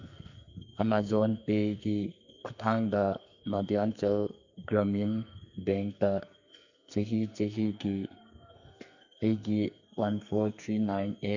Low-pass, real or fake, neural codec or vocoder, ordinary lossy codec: 7.2 kHz; fake; codec, 44.1 kHz, 2.6 kbps, SNAC; none